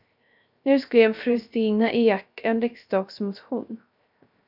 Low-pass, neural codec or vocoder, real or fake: 5.4 kHz; codec, 16 kHz, 0.3 kbps, FocalCodec; fake